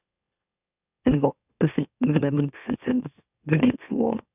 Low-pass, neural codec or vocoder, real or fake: 3.6 kHz; autoencoder, 44.1 kHz, a latent of 192 numbers a frame, MeloTTS; fake